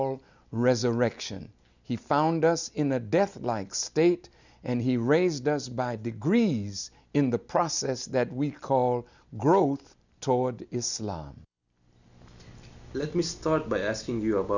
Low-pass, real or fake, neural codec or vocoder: 7.2 kHz; real; none